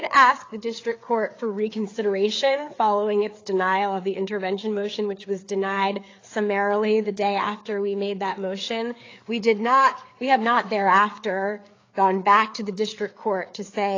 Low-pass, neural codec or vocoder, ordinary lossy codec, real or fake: 7.2 kHz; codec, 16 kHz, 4 kbps, FreqCodec, larger model; AAC, 32 kbps; fake